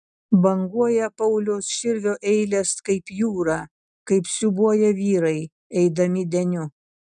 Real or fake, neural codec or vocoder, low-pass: real; none; 9.9 kHz